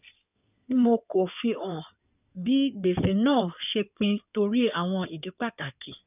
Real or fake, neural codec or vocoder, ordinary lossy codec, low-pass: fake; codec, 16 kHz in and 24 kHz out, 2.2 kbps, FireRedTTS-2 codec; none; 3.6 kHz